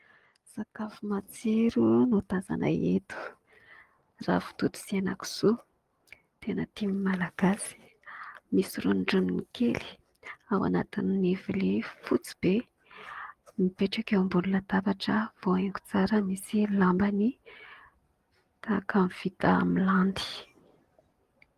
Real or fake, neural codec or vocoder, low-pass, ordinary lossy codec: real; none; 14.4 kHz; Opus, 24 kbps